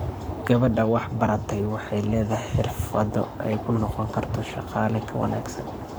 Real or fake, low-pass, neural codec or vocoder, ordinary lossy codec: fake; none; codec, 44.1 kHz, 7.8 kbps, Pupu-Codec; none